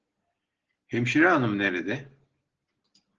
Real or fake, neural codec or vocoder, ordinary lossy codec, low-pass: real; none; Opus, 16 kbps; 7.2 kHz